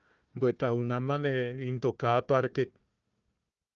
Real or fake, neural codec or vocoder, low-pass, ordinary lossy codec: fake; codec, 16 kHz, 1 kbps, FunCodec, trained on Chinese and English, 50 frames a second; 7.2 kHz; Opus, 24 kbps